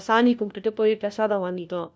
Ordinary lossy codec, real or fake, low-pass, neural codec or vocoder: none; fake; none; codec, 16 kHz, 1 kbps, FunCodec, trained on LibriTTS, 50 frames a second